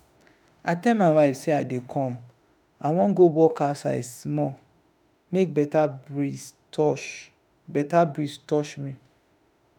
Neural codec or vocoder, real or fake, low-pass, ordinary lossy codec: autoencoder, 48 kHz, 32 numbers a frame, DAC-VAE, trained on Japanese speech; fake; none; none